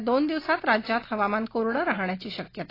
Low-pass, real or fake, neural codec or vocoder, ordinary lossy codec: 5.4 kHz; real; none; AAC, 24 kbps